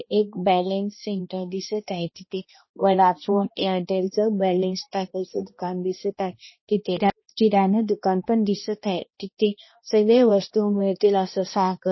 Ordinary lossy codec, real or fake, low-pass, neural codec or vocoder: MP3, 24 kbps; fake; 7.2 kHz; codec, 16 kHz, 1 kbps, X-Codec, HuBERT features, trained on balanced general audio